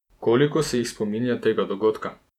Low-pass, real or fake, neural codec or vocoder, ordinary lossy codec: 19.8 kHz; fake; vocoder, 48 kHz, 128 mel bands, Vocos; none